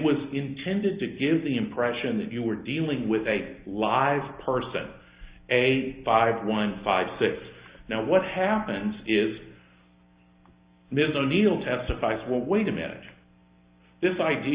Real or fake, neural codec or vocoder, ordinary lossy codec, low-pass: real; none; Opus, 64 kbps; 3.6 kHz